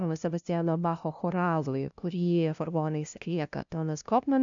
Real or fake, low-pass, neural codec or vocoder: fake; 7.2 kHz; codec, 16 kHz, 0.5 kbps, FunCodec, trained on LibriTTS, 25 frames a second